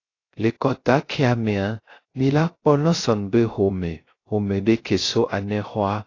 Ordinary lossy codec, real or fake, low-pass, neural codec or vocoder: AAC, 32 kbps; fake; 7.2 kHz; codec, 16 kHz, 0.3 kbps, FocalCodec